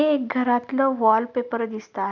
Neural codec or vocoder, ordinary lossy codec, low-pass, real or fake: none; none; 7.2 kHz; real